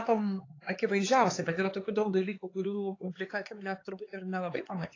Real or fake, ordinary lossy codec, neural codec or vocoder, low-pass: fake; AAC, 32 kbps; codec, 16 kHz, 4 kbps, X-Codec, HuBERT features, trained on LibriSpeech; 7.2 kHz